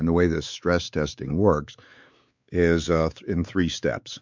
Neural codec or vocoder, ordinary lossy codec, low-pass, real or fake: codec, 16 kHz, 4 kbps, X-Codec, WavLM features, trained on Multilingual LibriSpeech; MP3, 64 kbps; 7.2 kHz; fake